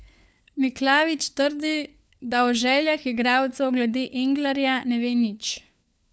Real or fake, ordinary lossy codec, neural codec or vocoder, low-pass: fake; none; codec, 16 kHz, 4 kbps, FunCodec, trained on LibriTTS, 50 frames a second; none